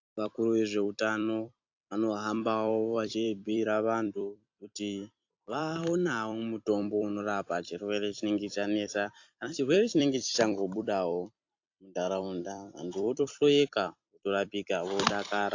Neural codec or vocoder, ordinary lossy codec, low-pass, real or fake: vocoder, 44.1 kHz, 128 mel bands every 256 samples, BigVGAN v2; AAC, 48 kbps; 7.2 kHz; fake